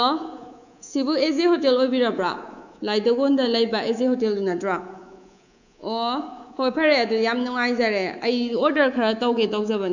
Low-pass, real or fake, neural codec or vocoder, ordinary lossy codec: 7.2 kHz; fake; codec, 24 kHz, 3.1 kbps, DualCodec; none